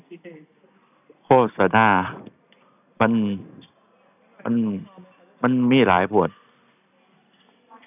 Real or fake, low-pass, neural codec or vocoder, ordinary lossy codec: real; 3.6 kHz; none; none